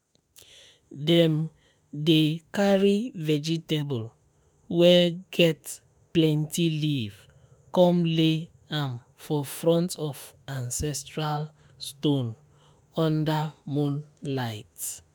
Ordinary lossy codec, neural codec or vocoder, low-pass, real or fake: none; autoencoder, 48 kHz, 32 numbers a frame, DAC-VAE, trained on Japanese speech; none; fake